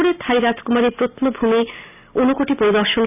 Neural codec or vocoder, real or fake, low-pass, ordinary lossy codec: none; real; 3.6 kHz; none